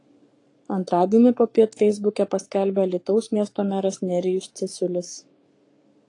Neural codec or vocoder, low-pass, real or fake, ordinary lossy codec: codec, 44.1 kHz, 7.8 kbps, Pupu-Codec; 10.8 kHz; fake; AAC, 48 kbps